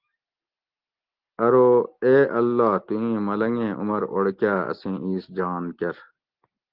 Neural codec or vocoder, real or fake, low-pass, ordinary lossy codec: none; real; 5.4 kHz; Opus, 16 kbps